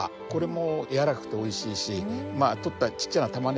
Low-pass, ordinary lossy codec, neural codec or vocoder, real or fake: none; none; none; real